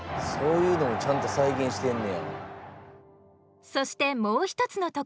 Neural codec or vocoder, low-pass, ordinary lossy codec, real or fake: none; none; none; real